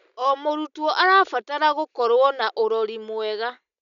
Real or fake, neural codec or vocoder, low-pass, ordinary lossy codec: real; none; 7.2 kHz; MP3, 96 kbps